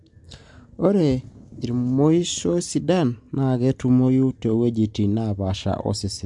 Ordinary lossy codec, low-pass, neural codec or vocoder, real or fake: MP3, 64 kbps; 10.8 kHz; none; real